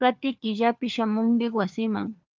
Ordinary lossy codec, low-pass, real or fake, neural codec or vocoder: Opus, 24 kbps; 7.2 kHz; fake; codec, 16 kHz, 4 kbps, FunCodec, trained on LibriTTS, 50 frames a second